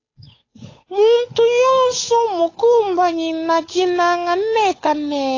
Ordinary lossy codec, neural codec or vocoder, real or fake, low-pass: AAC, 32 kbps; codec, 16 kHz, 8 kbps, FunCodec, trained on Chinese and English, 25 frames a second; fake; 7.2 kHz